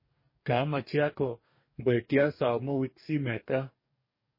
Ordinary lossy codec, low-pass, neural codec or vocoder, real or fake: MP3, 24 kbps; 5.4 kHz; codec, 44.1 kHz, 2.6 kbps, DAC; fake